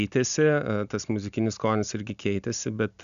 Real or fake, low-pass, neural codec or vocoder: real; 7.2 kHz; none